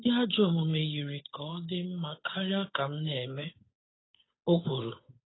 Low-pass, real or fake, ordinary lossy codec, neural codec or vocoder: 7.2 kHz; fake; AAC, 16 kbps; codec, 16 kHz, 8 kbps, FunCodec, trained on Chinese and English, 25 frames a second